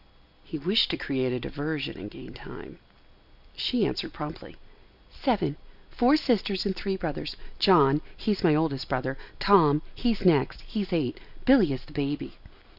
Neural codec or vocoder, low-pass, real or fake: none; 5.4 kHz; real